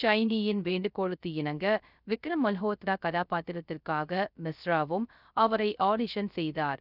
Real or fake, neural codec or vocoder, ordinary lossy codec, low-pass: fake; codec, 16 kHz, 0.3 kbps, FocalCodec; none; 5.4 kHz